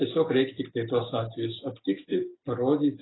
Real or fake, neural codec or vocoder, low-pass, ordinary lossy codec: real; none; 7.2 kHz; AAC, 16 kbps